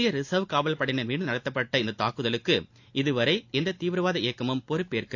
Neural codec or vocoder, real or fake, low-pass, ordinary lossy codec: none; real; 7.2 kHz; AAC, 48 kbps